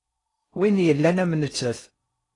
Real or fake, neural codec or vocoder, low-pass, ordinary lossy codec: fake; codec, 16 kHz in and 24 kHz out, 0.6 kbps, FocalCodec, streaming, 4096 codes; 10.8 kHz; AAC, 32 kbps